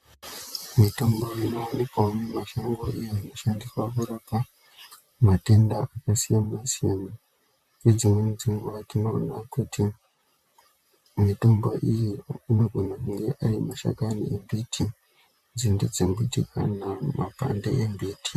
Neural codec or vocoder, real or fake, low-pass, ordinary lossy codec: vocoder, 44.1 kHz, 128 mel bands, Pupu-Vocoder; fake; 14.4 kHz; MP3, 96 kbps